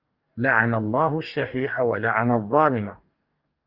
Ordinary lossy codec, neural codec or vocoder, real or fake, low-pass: Opus, 32 kbps; codec, 44.1 kHz, 2.6 kbps, DAC; fake; 5.4 kHz